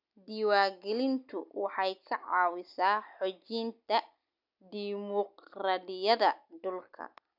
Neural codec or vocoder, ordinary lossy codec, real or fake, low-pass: none; none; real; 5.4 kHz